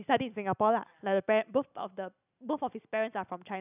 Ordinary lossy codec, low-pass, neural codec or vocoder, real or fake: none; 3.6 kHz; none; real